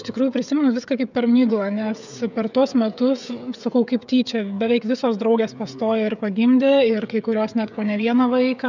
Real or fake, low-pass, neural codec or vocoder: fake; 7.2 kHz; codec, 16 kHz, 16 kbps, FreqCodec, smaller model